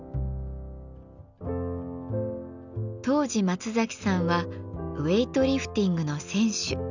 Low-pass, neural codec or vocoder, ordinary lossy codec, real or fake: 7.2 kHz; none; none; real